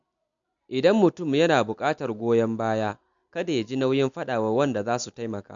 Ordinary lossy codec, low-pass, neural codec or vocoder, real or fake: MP3, 64 kbps; 7.2 kHz; none; real